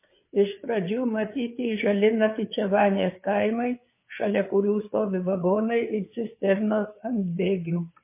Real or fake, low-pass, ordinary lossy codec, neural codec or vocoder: fake; 3.6 kHz; MP3, 24 kbps; codec, 16 kHz, 4 kbps, FreqCodec, larger model